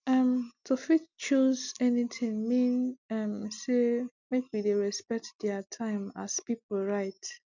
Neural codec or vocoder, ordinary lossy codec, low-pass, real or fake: none; none; 7.2 kHz; real